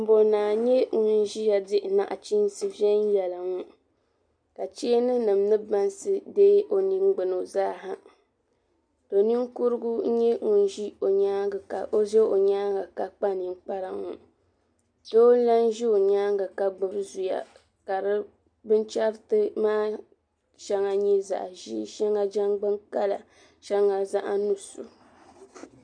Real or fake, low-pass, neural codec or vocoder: real; 9.9 kHz; none